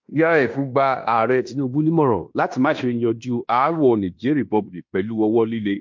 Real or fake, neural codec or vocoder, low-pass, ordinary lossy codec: fake; codec, 16 kHz in and 24 kHz out, 0.9 kbps, LongCat-Audio-Codec, fine tuned four codebook decoder; 7.2 kHz; MP3, 48 kbps